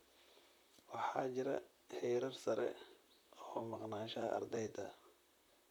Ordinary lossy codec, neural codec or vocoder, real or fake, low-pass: none; vocoder, 44.1 kHz, 128 mel bands, Pupu-Vocoder; fake; none